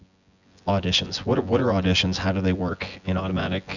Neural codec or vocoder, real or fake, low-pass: vocoder, 24 kHz, 100 mel bands, Vocos; fake; 7.2 kHz